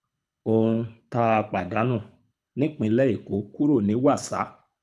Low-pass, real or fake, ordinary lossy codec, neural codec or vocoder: none; fake; none; codec, 24 kHz, 6 kbps, HILCodec